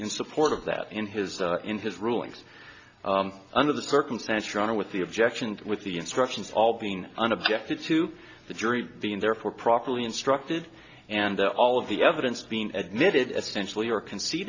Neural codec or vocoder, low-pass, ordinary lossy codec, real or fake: none; 7.2 kHz; AAC, 48 kbps; real